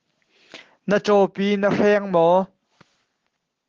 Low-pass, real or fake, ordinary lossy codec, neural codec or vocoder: 7.2 kHz; real; Opus, 16 kbps; none